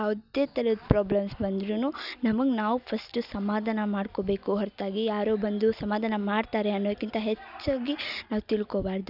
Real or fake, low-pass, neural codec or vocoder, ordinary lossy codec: real; 5.4 kHz; none; none